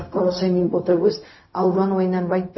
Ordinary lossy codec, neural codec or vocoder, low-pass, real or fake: MP3, 24 kbps; codec, 16 kHz, 0.4 kbps, LongCat-Audio-Codec; 7.2 kHz; fake